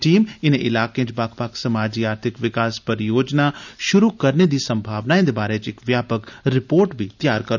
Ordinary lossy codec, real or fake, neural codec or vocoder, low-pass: none; real; none; 7.2 kHz